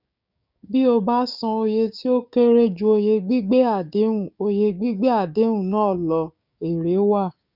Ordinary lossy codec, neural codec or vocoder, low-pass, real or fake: none; codec, 16 kHz, 6 kbps, DAC; 5.4 kHz; fake